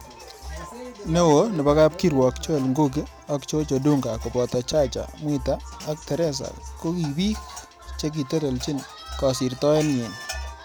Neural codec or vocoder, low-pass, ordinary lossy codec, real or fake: none; none; none; real